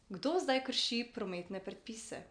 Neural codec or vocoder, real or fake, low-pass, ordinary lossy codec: none; real; 9.9 kHz; none